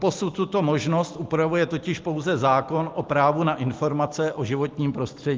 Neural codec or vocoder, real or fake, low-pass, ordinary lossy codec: none; real; 7.2 kHz; Opus, 32 kbps